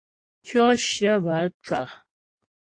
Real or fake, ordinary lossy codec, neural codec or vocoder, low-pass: fake; AAC, 48 kbps; codec, 16 kHz in and 24 kHz out, 1.1 kbps, FireRedTTS-2 codec; 9.9 kHz